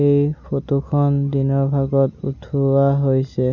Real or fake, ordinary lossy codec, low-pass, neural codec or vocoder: real; none; 7.2 kHz; none